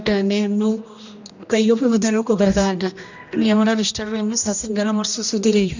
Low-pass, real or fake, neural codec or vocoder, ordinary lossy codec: 7.2 kHz; fake; codec, 16 kHz, 1 kbps, X-Codec, HuBERT features, trained on general audio; none